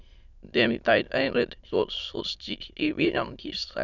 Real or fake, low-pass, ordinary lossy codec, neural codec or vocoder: fake; 7.2 kHz; none; autoencoder, 22.05 kHz, a latent of 192 numbers a frame, VITS, trained on many speakers